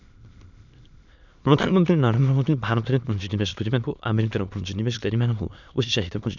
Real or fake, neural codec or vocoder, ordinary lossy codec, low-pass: fake; autoencoder, 22.05 kHz, a latent of 192 numbers a frame, VITS, trained on many speakers; none; 7.2 kHz